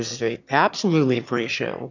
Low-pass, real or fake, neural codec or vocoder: 7.2 kHz; fake; autoencoder, 22.05 kHz, a latent of 192 numbers a frame, VITS, trained on one speaker